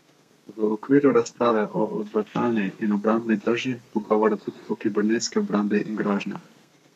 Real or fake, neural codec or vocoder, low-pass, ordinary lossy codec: fake; codec, 32 kHz, 1.9 kbps, SNAC; 14.4 kHz; none